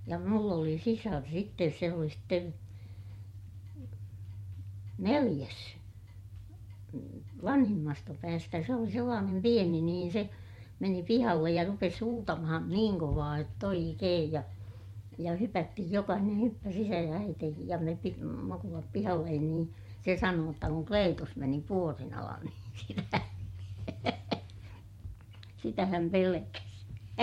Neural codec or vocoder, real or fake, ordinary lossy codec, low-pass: vocoder, 44.1 kHz, 128 mel bands every 512 samples, BigVGAN v2; fake; MP3, 64 kbps; 19.8 kHz